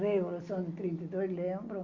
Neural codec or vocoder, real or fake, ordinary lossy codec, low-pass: none; real; Opus, 64 kbps; 7.2 kHz